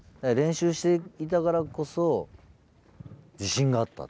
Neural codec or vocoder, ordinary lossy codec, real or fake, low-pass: none; none; real; none